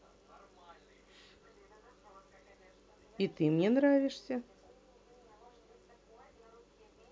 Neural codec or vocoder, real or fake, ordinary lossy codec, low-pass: none; real; none; none